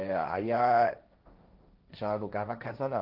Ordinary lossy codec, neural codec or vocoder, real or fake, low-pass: Opus, 24 kbps; codec, 16 kHz, 1.1 kbps, Voila-Tokenizer; fake; 5.4 kHz